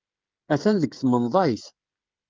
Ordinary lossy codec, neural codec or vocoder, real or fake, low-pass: Opus, 24 kbps; codec, 16 kHz, 16 kbps, FreqCodec, smaller model; fake; 7.2 kHz